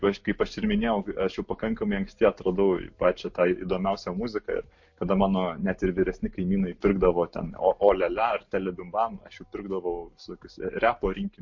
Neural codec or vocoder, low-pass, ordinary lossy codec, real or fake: none; 7.2 kHz; MP3, 48 kbps; real